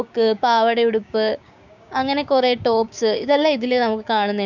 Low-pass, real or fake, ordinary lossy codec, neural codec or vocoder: 7.2 kHz; fake; none; codec, 16 kHz, 6 kbps, DAC